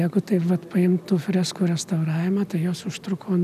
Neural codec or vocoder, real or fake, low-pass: none; real; 14.4 kHz